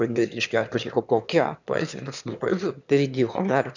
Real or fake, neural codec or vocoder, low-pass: fake; autoencoder, 22.05 kHz, a latent of 192 numbers a frame, VITS, trained on one speaker; 7.2 kHz